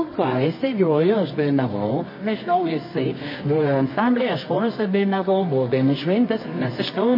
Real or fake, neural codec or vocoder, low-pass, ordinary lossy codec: fake; codec, 24 kHz, 0.9 kbps, WavTokenizer, medium music audio release; 5.4 kHz; MP3, 24 kbps